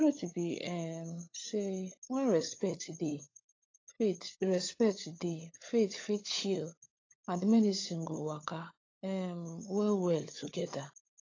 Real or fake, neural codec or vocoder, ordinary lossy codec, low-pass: fake; codec, 16 kHz, 16 kbps, FunCodec, trained on LibriTTS, 50 frames a second; AAC, 32 kbps; 7.2 kHz